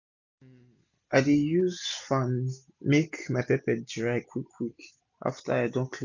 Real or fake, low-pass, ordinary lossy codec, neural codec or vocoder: real; 7.2 kHz; none; none